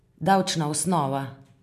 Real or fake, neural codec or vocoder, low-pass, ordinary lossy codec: real; none; 14.4 kHz; MP3, 96 kbps